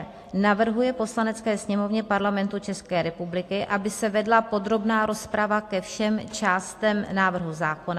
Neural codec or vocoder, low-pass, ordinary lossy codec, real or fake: none; 14.4 kHz; AAC, 64 kbps; real